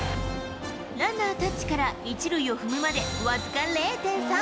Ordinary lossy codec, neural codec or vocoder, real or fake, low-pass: none; none; real; none